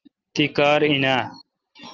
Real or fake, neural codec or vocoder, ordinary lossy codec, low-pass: real; none; Opus, 24 kbps; 7.2 kHz